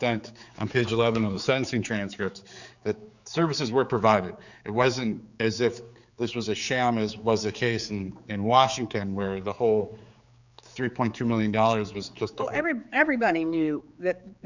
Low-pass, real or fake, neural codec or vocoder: 7.2 kHz; fake; codec, 16 kHz, 4 kbps, X-Codec, HuBERT features, trained on general audio